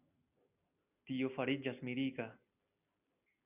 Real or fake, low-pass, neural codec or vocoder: real; 3.6 kHz; none